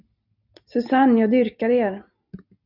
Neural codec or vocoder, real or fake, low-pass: none; real; 5.4 kHz